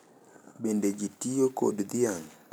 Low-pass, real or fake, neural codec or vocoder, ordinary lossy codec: none; real; none; none